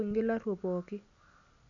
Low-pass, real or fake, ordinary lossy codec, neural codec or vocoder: 7.2 kHz; real; none; none